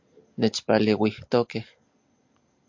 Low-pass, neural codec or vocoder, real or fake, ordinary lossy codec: 7.2 kHz; none; real; MP3, 48 kbps